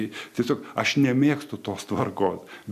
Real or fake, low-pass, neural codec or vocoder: real; 14.4 kHz; none